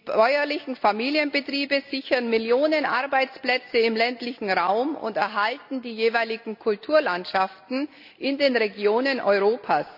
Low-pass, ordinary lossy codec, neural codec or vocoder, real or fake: 5.4 kHz; AAC, 48 kbps; none; real